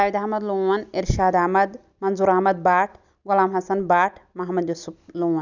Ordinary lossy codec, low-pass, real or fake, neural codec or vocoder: none; 7.2 kHz; real; none